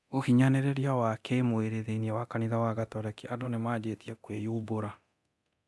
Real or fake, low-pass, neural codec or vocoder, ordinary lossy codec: fake; none; codec, 24 kHz, 0.9 kbps, DualCodec; none